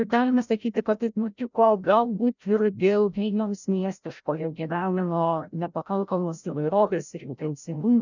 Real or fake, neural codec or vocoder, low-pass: fake; codec, 16 kHz, 0.5 kbps, FreqCodec, larger model; 7.2 kHz